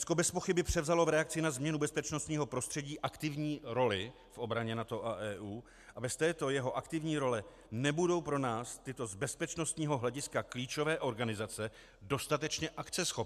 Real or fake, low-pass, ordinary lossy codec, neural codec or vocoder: real; 14.4 kHz; MP3, 96 kbps; none